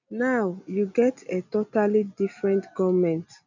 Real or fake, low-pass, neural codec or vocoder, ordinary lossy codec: real; 7.2 kHz; none; none